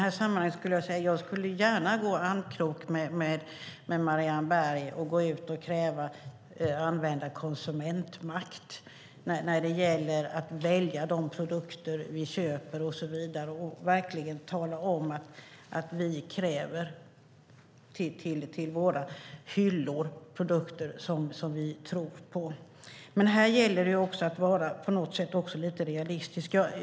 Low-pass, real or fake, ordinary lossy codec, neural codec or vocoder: none; real; none; none